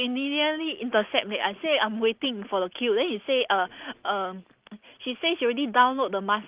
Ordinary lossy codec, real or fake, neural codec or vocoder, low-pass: Opus, 24 kbps; real; none; 3.6 kHz